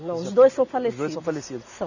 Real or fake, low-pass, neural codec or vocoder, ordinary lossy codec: real; 7.2 kHz; none; none